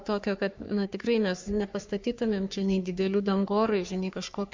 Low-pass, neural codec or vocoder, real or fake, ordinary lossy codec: 7.2 kHz; codec, 44.1 kHz, 3.4 kbps, Pupu-Codec; fake; MP3, 64 kbps